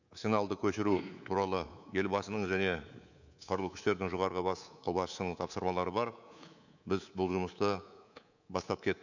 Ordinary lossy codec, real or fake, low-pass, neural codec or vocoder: none; fake; 7.2 kHz; codec, 24 kHz, 3.1 kbps, DualCodec